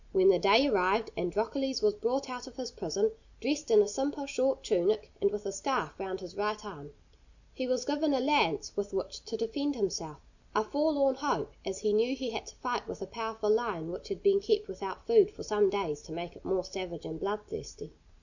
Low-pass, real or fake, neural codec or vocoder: 7.2 kHz; real; none